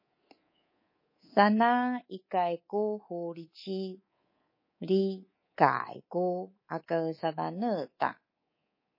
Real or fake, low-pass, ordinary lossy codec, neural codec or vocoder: fake; 5.4 kHz; MP3, 24 kbps; codec, 24 kHz, 3.1 kbps, DualCodec